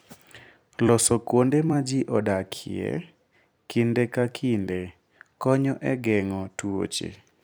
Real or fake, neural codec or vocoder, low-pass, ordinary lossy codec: fake; vocoder, 44.1 kHz, 128 mel bands every 256 samples, BigVGAN v2; none; none